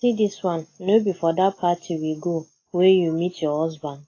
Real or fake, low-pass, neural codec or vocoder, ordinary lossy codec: real; 7.2 kHz; none; AAC, 32 kbps